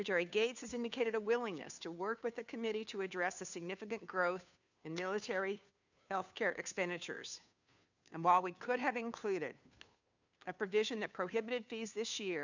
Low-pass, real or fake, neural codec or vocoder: 7.2 kHz; fake; codec, 16 kHz, 2 kbps, FunCodec, trained on Chinese and English, 25 frames a second